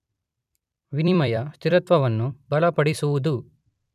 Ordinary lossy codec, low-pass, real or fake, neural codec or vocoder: none; 14.4 kHz; fake; vocoder, 44.1 kHz, 128 mel bands every 256 samples, BigVGAN v2